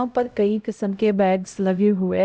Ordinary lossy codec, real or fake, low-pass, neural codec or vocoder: none; fake; none; codec, 16 kHz, 0.5 kbps, X-Codec, HuBERT features, trained on LibriSpeech